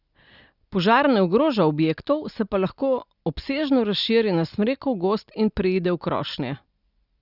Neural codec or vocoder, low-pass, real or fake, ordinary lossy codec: none; 5.4 kHz; real; Opus, 64 kbps